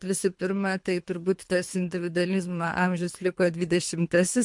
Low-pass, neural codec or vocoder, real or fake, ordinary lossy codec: 10.8 kHz; codec, 24 kHz, 3 kbps, HILCodec; fake; MP3, 64 kbps